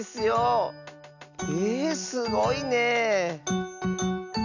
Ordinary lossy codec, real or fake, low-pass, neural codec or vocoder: none; real; 7.2 kHz; none